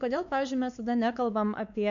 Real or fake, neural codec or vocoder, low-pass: fake; codec, 16 kHz, 4 kbps, X-Codec, WavLM features, trained on Multilingual LibriSpeech; 7.2 kHz